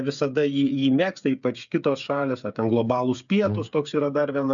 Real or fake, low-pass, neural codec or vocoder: fake; 7.2 kHz; codec, 16 kHz, 8 kbps, FreqCodec, smaller model